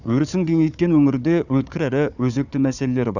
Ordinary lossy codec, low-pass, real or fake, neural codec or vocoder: none; 7.2 kHz; fake; codec, 16 kHz, 4 kbps, FunCodec, trained on Chinese and English, 50 frames a second